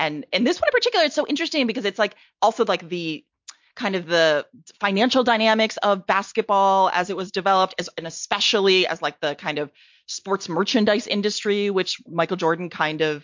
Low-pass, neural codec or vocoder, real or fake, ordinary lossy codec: 7.2 kHz; none; real; MP3, 48 kbps